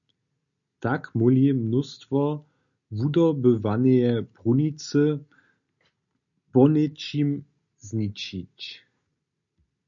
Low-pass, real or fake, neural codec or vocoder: 7.2 kHz; real; none